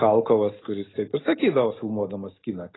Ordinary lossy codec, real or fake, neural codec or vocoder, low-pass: AAC, 16 kbps; real; none; 7.2 kHz